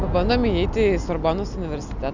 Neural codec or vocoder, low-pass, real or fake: none; 7.2 kHz; real